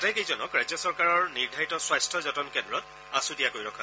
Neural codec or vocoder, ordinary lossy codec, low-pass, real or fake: none; none; none; real